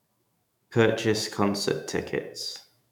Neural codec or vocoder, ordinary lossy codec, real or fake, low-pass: autoencoder, 48 kHz, 128 numbers a frame, DAC-VAE, trained on Japanese speech; none; fake; 19.8 kHz